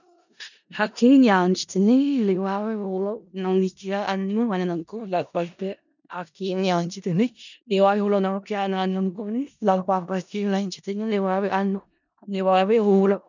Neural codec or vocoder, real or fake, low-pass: codec, 16 kHz in and 24 kHz out, 0.4 kbps, LongCat-Audio-Codec, four codebook decoder; fake; 7.2 kHz